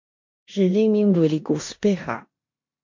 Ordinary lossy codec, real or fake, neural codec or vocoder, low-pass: MP3, 48 kbps; fake; codec, 16 kHz in and 24 kHz out, 0.9 kbps, LongCat-Audio-Codec, four codebook decoder; 7.2 kHz